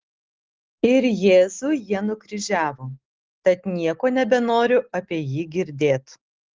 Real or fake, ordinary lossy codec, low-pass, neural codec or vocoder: real; Opus, 16 kbps; 7.2 kHz; none